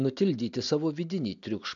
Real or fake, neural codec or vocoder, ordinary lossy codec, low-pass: real; none; AAC, 64 kbps; 7.2 kHz